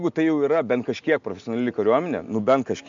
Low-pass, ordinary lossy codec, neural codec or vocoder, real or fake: 7.2 kHz; AAC, 64 kbps; none; real